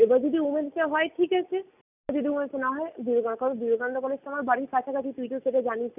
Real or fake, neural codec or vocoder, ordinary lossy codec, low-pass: real; none; none; 3.6 kHz